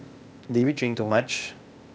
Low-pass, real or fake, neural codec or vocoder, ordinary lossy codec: none; fake; codec, 16 kHz, 0.8 kbps, ZipCodec; none